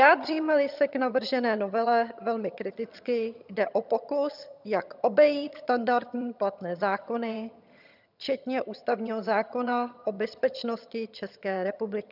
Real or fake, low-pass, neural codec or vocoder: fake; 5.4 kHz; vocoder, 22.05 kHz, 80 mel bands, HiFi-GAN